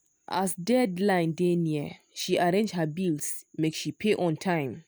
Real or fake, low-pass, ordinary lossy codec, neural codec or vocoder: real; none; none; none